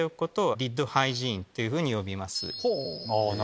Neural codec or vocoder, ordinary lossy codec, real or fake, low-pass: none; none; real; none